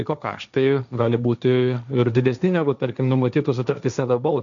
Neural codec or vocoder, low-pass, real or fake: codec, 16 kHz, 1.1 kbps, Voila-Tokenizer; 7.2 kHz; fake